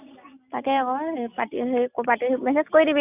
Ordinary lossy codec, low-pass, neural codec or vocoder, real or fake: none; 3.6 kHz; none; real